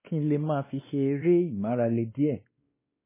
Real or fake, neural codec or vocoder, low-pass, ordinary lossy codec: fake; codec, 16 kHz, 2 kbps, X-Codec, WavLM features, trained on Multilingual LibriSpeech; 3.6 kHz; MP3, 16 kbps